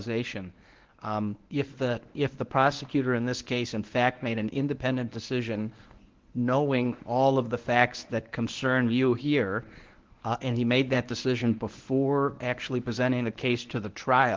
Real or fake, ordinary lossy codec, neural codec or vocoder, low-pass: fake; Opus, 16 kbps; codec, 24 kHz, 0.9 kbps, WavTokenizer, medium speech release version 1; 7.2 kHz